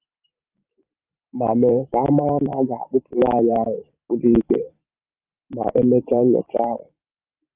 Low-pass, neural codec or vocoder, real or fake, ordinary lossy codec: 3.6 kHz; vocoder, 44.1 kHz, 128 mel bands every 512 samples, BigVGAN v2; fake; Opus, 24 kbps